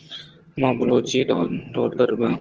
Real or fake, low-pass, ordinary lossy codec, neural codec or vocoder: fake; 7.2 kHz; Opus, 16 kbps; vocoder, 22.05 kHz, 80 mel bands, HiFi-GAN